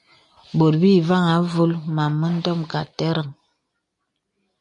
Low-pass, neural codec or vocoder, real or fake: 10.8 kHz; none; real